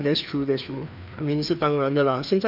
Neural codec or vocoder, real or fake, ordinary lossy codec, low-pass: codec, 16 kHz, 2 kbps, FreqCodec, larger model; fake; none; 5.4 kHz